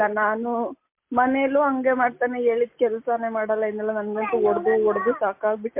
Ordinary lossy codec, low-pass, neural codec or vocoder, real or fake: MP3, 24 kbps; 3.6 kHz; none; real